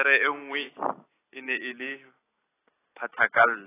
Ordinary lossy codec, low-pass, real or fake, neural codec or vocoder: AAC, 16 kbps; 3.6 kHz; real; none